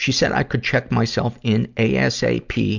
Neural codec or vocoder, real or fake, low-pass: none; real; 7.2 kHz